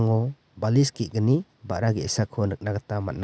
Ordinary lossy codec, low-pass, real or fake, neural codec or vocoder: none; none; real; none